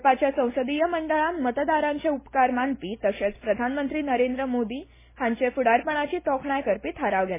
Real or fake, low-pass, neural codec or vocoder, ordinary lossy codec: real; 3.6 kHz; none; MP3, 16 kbps